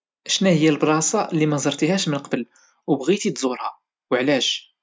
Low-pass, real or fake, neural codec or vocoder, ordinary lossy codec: none; real; none; none